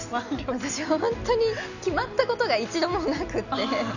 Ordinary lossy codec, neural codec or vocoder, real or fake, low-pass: none; none; real; 7.2 kHz